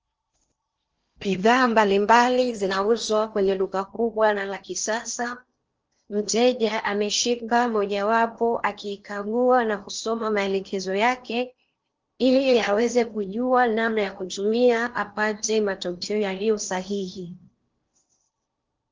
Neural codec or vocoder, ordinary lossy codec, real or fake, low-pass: codec, 16 kHz in and 24 kHz out, 0.8 kbps, FocalCodec, streaming, 65536 codes; Opus, 24 kbps; fake; 7.2 kHz